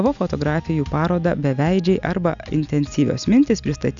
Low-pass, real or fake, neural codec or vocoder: 7.2 kHz; real; none